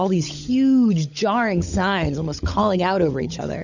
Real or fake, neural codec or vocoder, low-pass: fake; codec, 16 kHz, 16 kbps, FunCodec, trained on LibriTTS, 50 frames a second; 7.2 kHz